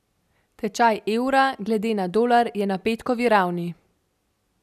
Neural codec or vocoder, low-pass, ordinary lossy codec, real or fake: none; 14.4 kHz; none; real